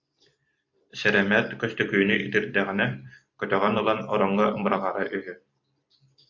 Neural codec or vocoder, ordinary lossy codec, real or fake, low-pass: vocoder, 44.1 kHz, 128 mel bands every 256 samples, BigVGAN v2; MP3, 48 kbps; fake; 7.2 kHz